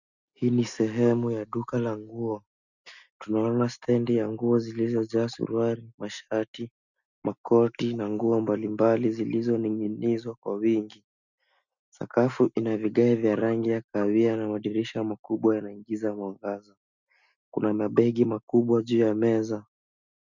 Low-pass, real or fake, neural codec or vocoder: 7.2 kHz; real; none